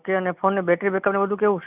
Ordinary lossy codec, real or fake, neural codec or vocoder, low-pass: none; real; none; 3.6 kHz